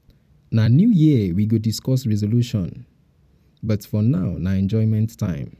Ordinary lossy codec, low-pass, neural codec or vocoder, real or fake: none; 14.4 kHz; vocoder, 44.1 kHz, 128 mel bands every 256 samples, BigVGAN v2; fake